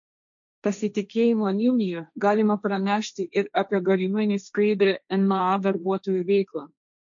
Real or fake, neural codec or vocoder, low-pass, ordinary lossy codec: fake; codec, 16 kHz, 1.1 kbps, Voila-Tokenizer; 7.2 kHz; MP3, 48 kbps